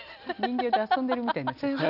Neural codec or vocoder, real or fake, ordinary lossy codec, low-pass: none; real; none; 5.4 kHz